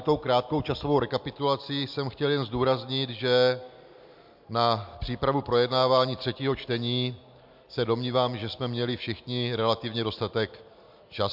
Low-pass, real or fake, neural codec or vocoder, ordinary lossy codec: 5.4 kHz; real; none; MP3, 48 kbps